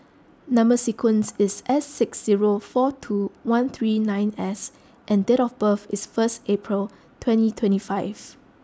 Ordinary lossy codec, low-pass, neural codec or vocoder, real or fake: none; none; none; real